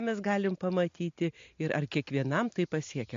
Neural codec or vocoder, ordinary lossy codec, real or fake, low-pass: none; MP3, 48 kbps; real; 7.2 kHz